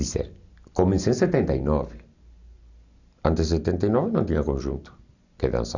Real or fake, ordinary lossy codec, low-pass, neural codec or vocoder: real; none; 7.2 kHz; none